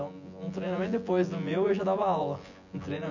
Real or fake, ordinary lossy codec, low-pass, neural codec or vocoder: fake; none; 7.2 kHz; vocoder, 24 kHz, 100 mel bands, Vocos